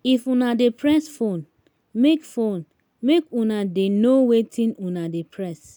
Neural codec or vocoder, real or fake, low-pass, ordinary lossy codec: none; real; none; none